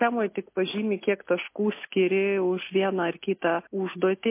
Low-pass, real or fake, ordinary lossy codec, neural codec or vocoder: 3.6 kHz; real; MP3, 24 kbps; none